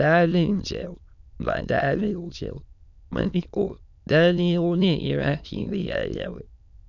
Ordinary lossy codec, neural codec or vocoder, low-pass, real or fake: none; autoencoder, 22.05 kHz, a latent of 192 numbers a frame, VITS, trained on many speakers; 7.2 kHz; fake